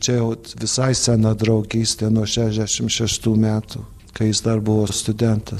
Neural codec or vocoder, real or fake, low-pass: none; real; 14.4 kHz